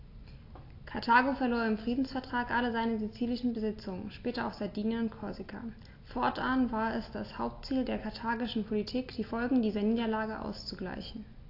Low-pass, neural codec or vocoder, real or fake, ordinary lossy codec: 5.4 kHz; none; real; AAC, 32 kbps